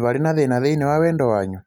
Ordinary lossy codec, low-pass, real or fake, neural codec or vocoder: none; 19.8 kHz; real; none